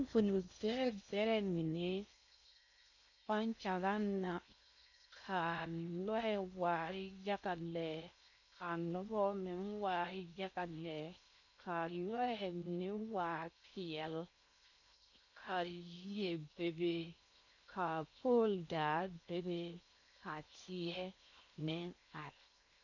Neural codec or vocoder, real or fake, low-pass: codec, 16 kHz in and 24 kHz out, 0.6 kbps, FocalCodec, streaming, 4096 codes; fake; 7.2 kHz